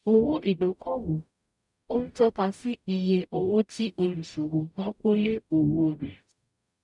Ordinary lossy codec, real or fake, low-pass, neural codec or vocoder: none; fake; 10.8 kHz; codec, 44.1 kHz, 0.9 kbps, DAC